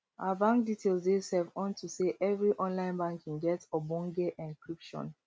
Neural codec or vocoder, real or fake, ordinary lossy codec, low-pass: none; real; none; none